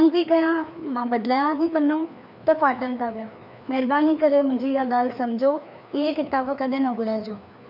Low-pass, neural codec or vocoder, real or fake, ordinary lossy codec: 5.4 kHz; codec, 16 kHz, 2 kbps, FreqCodec, larger model; fake; none